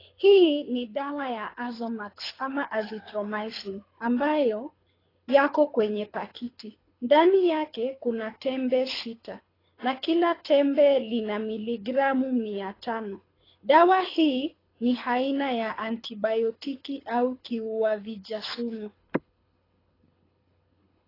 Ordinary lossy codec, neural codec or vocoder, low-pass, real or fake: AAC, 24 kbps; codec, 24 kHz, 6 kbps, HILCodec; 5.4 kHz; fake